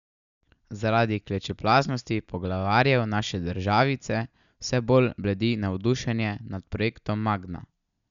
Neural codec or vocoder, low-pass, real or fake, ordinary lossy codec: none; 7.2 kHz; real; none